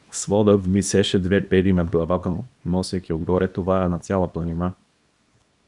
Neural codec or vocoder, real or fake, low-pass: codec, 24 kHz, 0.9 kbps, WavTokenizer, small release; fake; 10.8 kHz